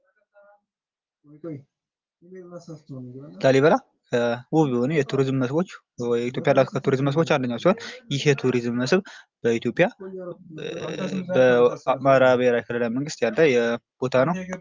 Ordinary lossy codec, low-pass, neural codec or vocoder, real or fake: Opus, 24 kbps; 7.2 kHz; none; real